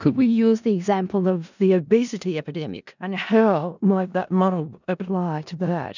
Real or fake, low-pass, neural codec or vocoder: fake; 7.2 kHz; codec, 16 kHz in and 24 kHz out, 0.4 kbps, LongCat-Audio-Codec, four codebook decoder